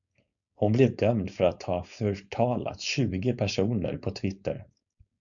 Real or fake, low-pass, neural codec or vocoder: fake; 7.2 kHz; codec, 16 kHz, 4.8 kbps, FACodec